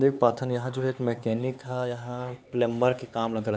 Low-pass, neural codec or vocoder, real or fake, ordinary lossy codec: none; codec, 16 kHz, 4 kbps, X-Codec, HuBERT features, trained on LibriSpeech; fake; none